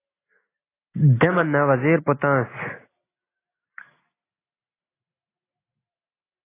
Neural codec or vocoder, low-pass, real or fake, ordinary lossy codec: none; 3.6 kHz; real; AAC, 16 kbps